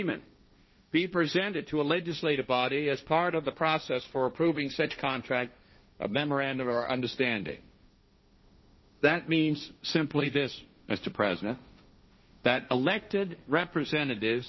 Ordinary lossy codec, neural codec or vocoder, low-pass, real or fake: MP3, 24 kbps; codec, 16 kHz, 1.1 kbps, Voila-Tokenizer; 7.2 kHz; fake